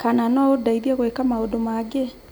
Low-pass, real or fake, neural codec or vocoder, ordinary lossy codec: none; real; none; none